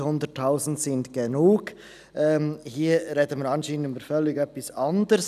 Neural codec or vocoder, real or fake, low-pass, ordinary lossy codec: none; real; 14.4 kHz; none